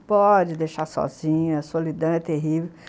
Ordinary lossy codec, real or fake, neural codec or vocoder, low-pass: none; real; none; none